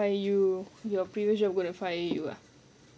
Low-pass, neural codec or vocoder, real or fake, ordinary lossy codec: none; none; real; none